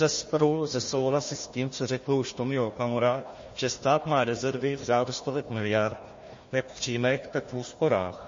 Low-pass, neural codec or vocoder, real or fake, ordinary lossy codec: 7.2 kHz; codec, 16 kHz, 1 kbps, FunCodec, trained on Chinese and English, 50 frames a second; fake; MP3, 32 kbps